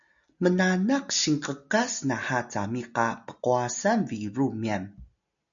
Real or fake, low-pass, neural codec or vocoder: real; 7.2 kHz; none